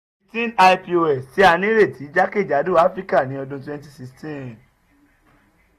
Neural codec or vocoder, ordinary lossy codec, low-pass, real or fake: none; AAC, 48 kbps; 14.4 kHz; real